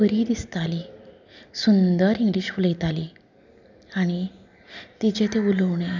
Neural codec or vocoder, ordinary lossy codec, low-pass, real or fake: none; none; 7.2 kHz; real